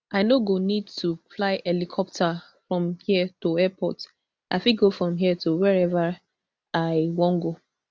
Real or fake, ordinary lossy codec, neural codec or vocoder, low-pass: real; none; none; none